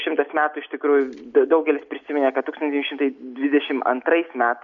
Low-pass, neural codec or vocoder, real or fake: 7.2 kHz; none; real